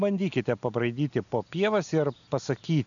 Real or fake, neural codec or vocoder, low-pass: real; none; 7.2 kHz